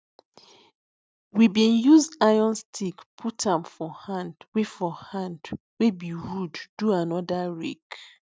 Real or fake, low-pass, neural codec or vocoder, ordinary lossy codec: real; none; none; none